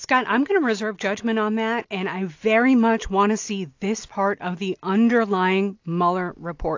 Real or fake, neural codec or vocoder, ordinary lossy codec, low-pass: real; none; AAC, 48 kbps; 7.2 kHz